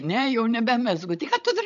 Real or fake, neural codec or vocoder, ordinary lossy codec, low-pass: fake; codec, 16 kHz, 16 kbps, FreqCodec, larger model; MP3, 64 kbps; 7.2 kHz